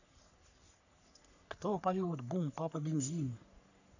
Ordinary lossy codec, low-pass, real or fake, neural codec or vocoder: none; 7.2 kHz; fake; codec, 44.1 kHz, 3.4 kbps, Pupu-Codec